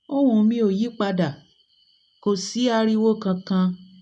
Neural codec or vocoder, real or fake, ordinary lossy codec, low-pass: none; real; none; none